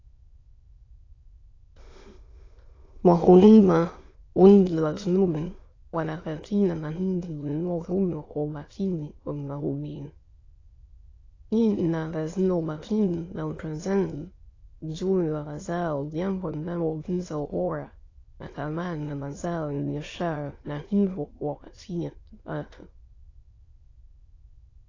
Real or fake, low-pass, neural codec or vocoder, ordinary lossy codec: fake; 7.2 kHz; autoencoder, 22.05 kHz, a latent of 192 numbers a frame, VITS, trained on many speakers; AAC, 32 kbps